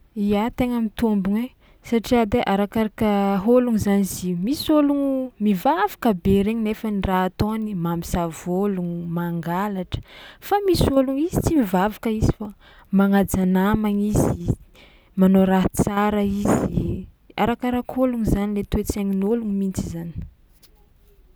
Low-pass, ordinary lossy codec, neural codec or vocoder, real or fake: none; none; none; real